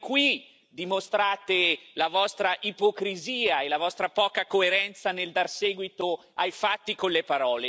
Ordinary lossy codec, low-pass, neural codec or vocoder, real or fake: none; none; none; real